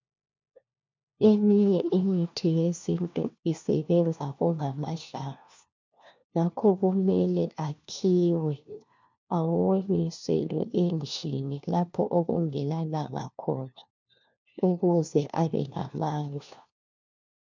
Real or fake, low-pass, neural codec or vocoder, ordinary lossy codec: fake; 7.2 kHz; codec, 16 kHz, 1 kbps, FunCodec, trained on LibriTTS, 50 frames a second; MP3, 64 kbps